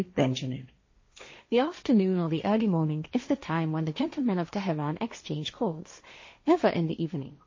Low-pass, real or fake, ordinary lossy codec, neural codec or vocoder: 7.2 kHz; fake; MP3, 32 kbps; codec, 16 kHz, 1.1 kbps, Voila-Tokenizer